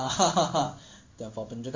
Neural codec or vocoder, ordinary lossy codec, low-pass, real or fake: codec, 16 kHz in and 24 kHz out, 1 kbps, XY-Tokenizer; MP3, 48 kbps; 7.2 kHz; fake